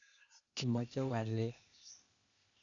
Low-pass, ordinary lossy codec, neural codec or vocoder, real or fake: 7.2 kHz; none; codec, 16 kHz, 0.8 kbps, ZipCodec; fake